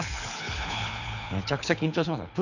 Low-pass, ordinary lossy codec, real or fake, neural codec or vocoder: 7.2 kHz; none; fake; codec, 24 kHz, 6 kbps, HILCodec